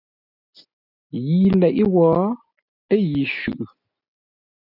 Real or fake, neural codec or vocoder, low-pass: real; none; 5.4 kHz